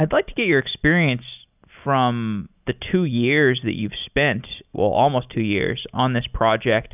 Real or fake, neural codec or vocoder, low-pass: real; none; 3.6 kHz